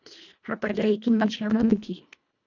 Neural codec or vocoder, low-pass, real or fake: codec, 24 kHz, 1.5 kbps, HILCodec; 7.2 kHz; fake